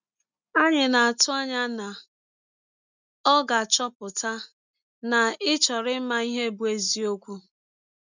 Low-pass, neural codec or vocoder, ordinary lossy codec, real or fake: 7.2 kHz; none; none; real